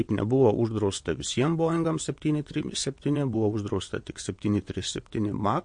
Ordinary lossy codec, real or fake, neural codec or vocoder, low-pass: MP3, 48 kbps; fake; vocoder, 22.05 kHz, 80 mel bands, WaveNeXt; 9.9 kHz